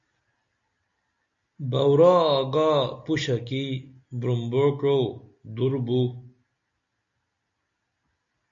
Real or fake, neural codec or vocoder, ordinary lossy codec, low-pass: real; none; MP3, 64 kbps; 7.2 kHz